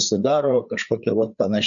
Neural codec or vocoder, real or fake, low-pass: codec, 16 kHz, 4 kbps, FreqCodec, larger model; fake; 7.2 kHz